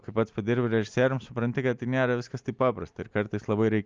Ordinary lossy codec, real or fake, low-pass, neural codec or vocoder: Opus, 24 kbps; real; 7.2 kHz; none